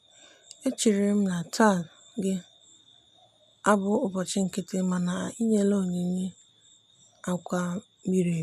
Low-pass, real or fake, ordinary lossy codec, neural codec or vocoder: 14.4 kHz; real; none; none